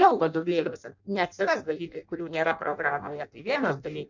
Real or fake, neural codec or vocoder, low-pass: fake; codec, 16 kHz in and 24 kHz out, 0.6 kbps, FireRedTTS-2 codec; 7.2 kHz